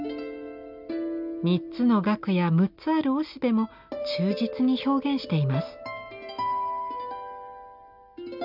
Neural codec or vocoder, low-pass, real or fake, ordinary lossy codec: none; 5.4 kHz; real; none